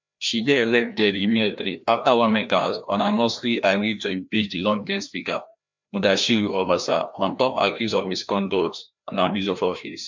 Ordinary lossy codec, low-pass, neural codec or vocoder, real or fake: MP3, 64 kbps; 7.2 kHz; codec, 16 kHz, 1 kbps, FreqCodec, larger model; fake